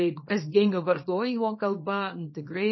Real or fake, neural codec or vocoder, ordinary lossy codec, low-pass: fake; codec, 24 kHz, 0.9 kbps, WavTokenizer, small release; MP3, 24 kbps; 7.2 kHz